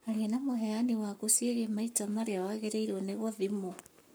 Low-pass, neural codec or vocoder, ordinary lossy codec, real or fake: none; codec, 44.1 kHz, 7.8 kbps, Pupu-Codec; none; fake